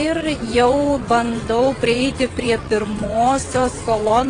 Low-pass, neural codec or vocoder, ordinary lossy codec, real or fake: 9.9 kHz; vocoder, 22.05 kHz, 80 mel bands, WaveNeXt; AAC, 32 kbps; fake